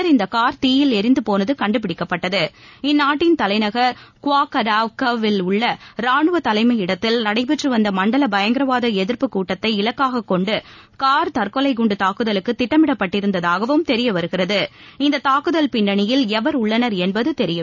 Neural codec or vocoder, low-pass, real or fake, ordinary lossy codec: none; 7.2 kHz; real; none